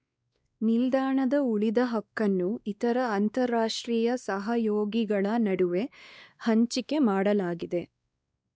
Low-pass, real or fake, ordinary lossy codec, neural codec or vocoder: none; fake; none; codec, 16 kHz, 2 kbps, X-Codec, WavLM features, trained on Multilingual LibriSpeech